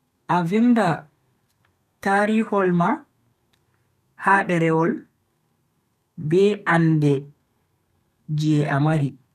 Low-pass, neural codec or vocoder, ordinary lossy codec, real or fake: 14.4 kHz; codec, 32 kHz, 1.9 kbps, SNAC; none; fake